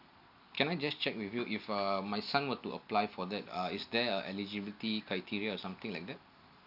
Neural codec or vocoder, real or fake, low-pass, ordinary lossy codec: none; real; 5.4 kHz; AAC, 48 kbps